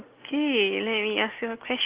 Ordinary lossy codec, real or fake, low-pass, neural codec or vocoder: Opus, 16 kbps; real; 3.6 kHz; none